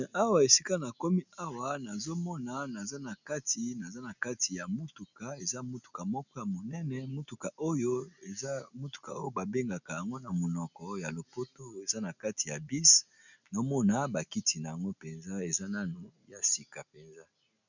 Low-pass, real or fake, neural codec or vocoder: 7.2 kHz; real; none